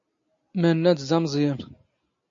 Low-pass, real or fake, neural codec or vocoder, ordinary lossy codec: 7.2 kHz; real; none; AAC, 64 kbps